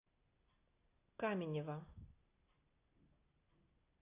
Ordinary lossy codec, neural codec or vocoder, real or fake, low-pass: AAC, 24 kbps; none; real; 3.6 kHz